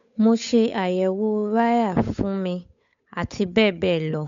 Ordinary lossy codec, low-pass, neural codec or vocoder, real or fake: MP3, 64 kbps; 7.2 kHz; codec, 16 kHz, 8 kbps, FunCodec, trained on Chinese and English, 25 frames a second; fake